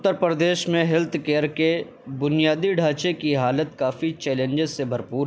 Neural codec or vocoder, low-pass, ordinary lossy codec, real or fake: none; none; none; real